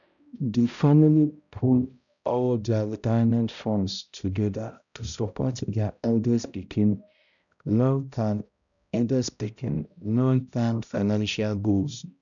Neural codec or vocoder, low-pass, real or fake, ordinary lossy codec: codec, 16 kHz, 0.5 kbps, X-Codec, HuBERT features, trained on balanced general audio; 7.2 kHz; fake; none